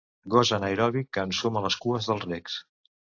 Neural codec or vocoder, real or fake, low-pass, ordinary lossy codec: none; real; 7.2 kHz; AAC, 48 kbps